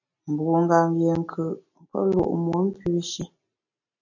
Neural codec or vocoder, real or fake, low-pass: none; real; 7.2 kHz